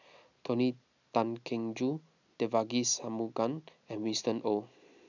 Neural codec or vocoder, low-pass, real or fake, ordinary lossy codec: none; 7.2 kHz; real; none